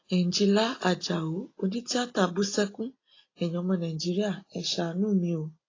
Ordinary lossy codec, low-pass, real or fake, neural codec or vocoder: AAC, 32 kbps; 7.2 kHz; real; none